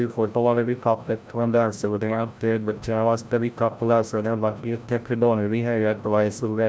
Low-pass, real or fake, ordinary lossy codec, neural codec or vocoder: none; fake; none; codec, 16 kHz, 0.5 kbps, FreqCodec, larger model